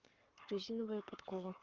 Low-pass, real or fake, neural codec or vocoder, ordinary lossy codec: 7.2 kHz; fake; codec, 44.1 kHz, 7.8 kbps, Pupu-Codec; Opus, 24 kbps